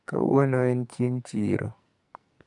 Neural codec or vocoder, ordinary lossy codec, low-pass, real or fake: codec, 44.1 kHz, 2.6 kbps, SNAC; none; 10.8 kHz; fake